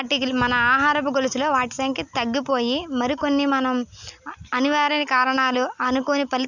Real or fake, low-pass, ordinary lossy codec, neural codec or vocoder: real; 7.2 kHz; none; none